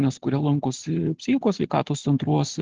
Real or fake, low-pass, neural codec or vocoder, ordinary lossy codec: real; 7.2 kHz; none; Opus, 16 kbps